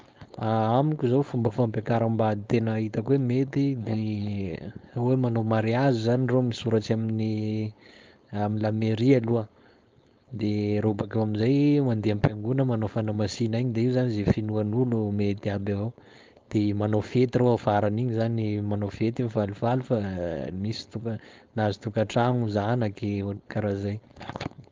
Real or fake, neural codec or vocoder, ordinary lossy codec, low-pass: fake; codec, 16 kHz, 4.8 kbps, FACodec; Opus, 32 kbps; 7.2 kHz